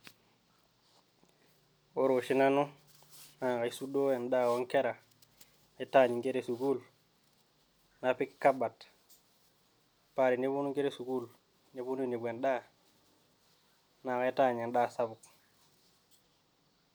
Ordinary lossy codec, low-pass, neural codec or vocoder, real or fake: none; none; none; real